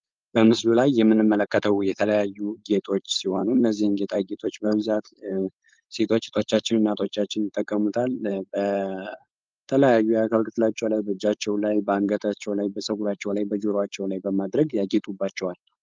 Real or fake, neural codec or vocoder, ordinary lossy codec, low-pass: fake; codec, 16 kHz, 4.8 kbps, FACodec; Opus, 24 kbps; 7.2 kHz